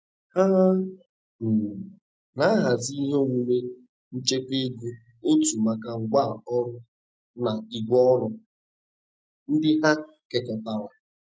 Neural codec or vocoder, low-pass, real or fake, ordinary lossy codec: none; none; real; none